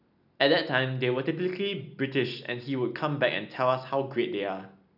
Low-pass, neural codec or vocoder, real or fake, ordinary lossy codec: 5.4 kHz; none; real; none